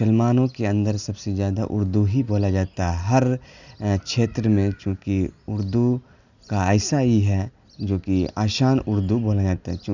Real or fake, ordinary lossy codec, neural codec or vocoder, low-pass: real; none; none; 7.2 kHz